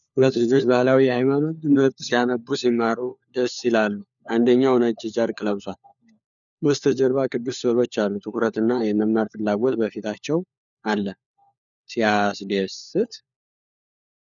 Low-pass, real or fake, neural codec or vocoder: 7.2 kHz; fake; codec, 16 kHz, 4 kbps, FunCodec, trained on LibriTTS, 50 frames a second